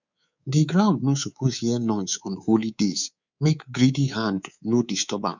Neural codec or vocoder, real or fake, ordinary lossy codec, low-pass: codec, 24 kHz, 3.1 kbps, DualCodec; fake; none; 7.2 kHz